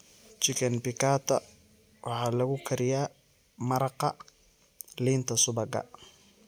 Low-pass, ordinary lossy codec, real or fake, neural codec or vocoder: none; none; real; none